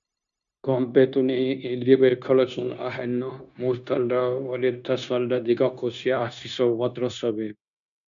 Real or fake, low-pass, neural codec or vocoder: fake; 7.2 kHz; codec, 16 kHz, 0.9 kbps, LongCat-Audio-Codec